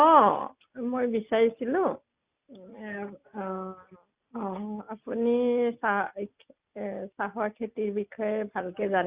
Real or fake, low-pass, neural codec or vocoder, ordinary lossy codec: real; 3.6 kHz; none; Opus, 64 kbps